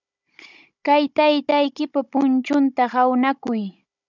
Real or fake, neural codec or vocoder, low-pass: fake; codec, 16 kHz, 16 kbps, FunCodec, trained on Chinese and English, 50 frames a second; 7.2 kHz